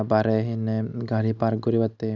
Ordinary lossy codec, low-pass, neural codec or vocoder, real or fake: none; 7.2 kHz; none; real